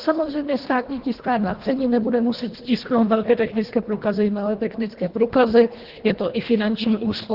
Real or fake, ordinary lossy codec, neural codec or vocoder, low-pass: fake; Opus, 16 kbps; codec, 24 kHz, 1.5 kbps, HILCodec; 5.4 kHz